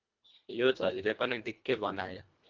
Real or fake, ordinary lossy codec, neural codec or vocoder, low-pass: fake; Opus, 24 kbps; codec, 24 kHz, 1.5 kbps, HILCodec; 7.2 kHz